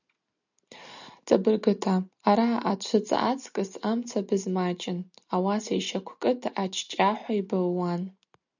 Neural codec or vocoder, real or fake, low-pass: none; real; 7.2 kHz